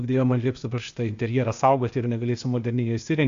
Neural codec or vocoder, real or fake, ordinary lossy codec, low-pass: codec, 16 kHz, 0.8 kbps, ZipCodec; fake; Opus, 64 kbps; 7.2 kHz